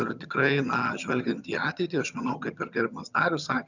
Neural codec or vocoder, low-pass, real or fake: vocoder, 22.05 kHz, 80 mel bands, HiFi-GAN; 7.2 kHz; fake